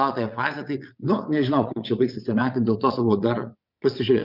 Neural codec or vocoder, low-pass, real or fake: vocoder, 22.05 kHz, 80 mel bands, WaveNeXt; 5.4 kHz; fake